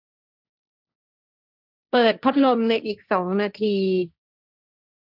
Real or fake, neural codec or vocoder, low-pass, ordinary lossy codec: fake; codec, 16 kHz, 1.1 kbps, Voila-Tokenizer; 5.4 kHz; none